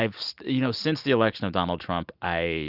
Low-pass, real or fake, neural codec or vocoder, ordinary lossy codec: 5.4 kHz; real; none; Opus, 64 kbps